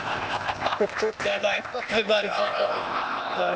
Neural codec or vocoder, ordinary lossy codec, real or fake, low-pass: codec, 16 kHz, 0.8 kbps, ZipCodec; none; fake; none